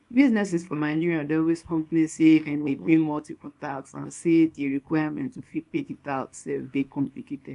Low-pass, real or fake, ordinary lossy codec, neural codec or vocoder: 10.8 kHz; fake; none; codec, 24 kHz, 0.9 kbps, WavTokenizer, small release